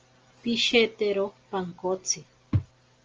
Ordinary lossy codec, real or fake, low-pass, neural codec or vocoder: Opus, 24 kbps; real; 7.2 kHz; none